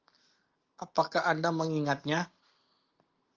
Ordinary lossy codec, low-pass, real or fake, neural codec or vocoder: Opus, 24 kbps; 7.2 kHz; real; none